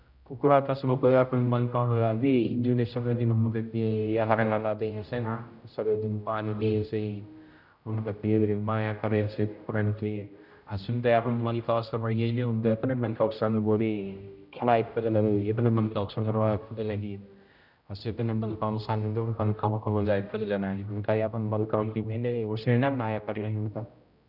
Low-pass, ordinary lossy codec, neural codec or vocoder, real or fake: 5.4 kHz; none; codec, 16 kHz, 0.5 kbps, X-Codec, HuBERT features, trained on general audio; fake